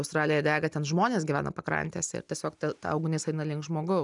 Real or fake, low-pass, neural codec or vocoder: real; 10.8 kHz; none